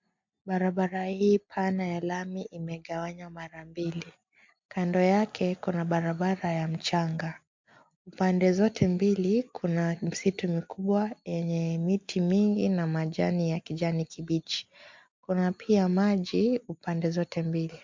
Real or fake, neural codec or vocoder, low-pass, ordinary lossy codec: real; none; 7.2 kHz; MP3, 48 kbps